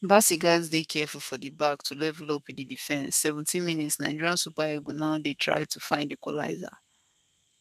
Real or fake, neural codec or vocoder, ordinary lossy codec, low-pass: fake; codec, 32 kHz, 1.9 kbps, SNAC; none; 14.4 kHz